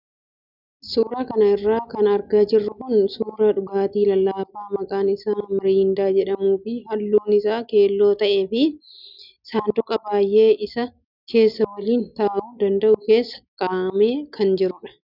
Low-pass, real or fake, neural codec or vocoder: 5.4 kHz; real; none